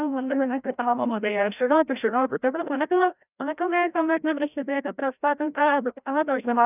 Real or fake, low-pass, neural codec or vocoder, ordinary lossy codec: fake; 3.6 kHz; codec, 16 kHz, 0.5 kbps, FreqCodec, larger model; none